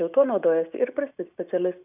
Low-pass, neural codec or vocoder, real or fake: 3.6 kHz; none; real